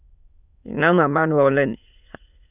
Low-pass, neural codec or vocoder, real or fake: 3.6 kHz; autoencoder, 22.05 kHz, a latent of 192 numbers a frame, VITS, trained on many speakers; fake